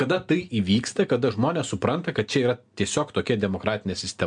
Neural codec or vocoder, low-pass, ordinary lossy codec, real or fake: none; 9.9 kHz; MP3, 64 kbps; real